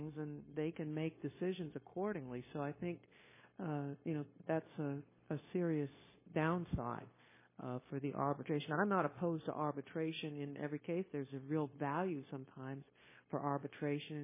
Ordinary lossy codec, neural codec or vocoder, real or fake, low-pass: MP3, 16 kbps; codec, 16 kHz, 0.9 kbps, LongCat-Audio-Codec; fake; 3.6 kHz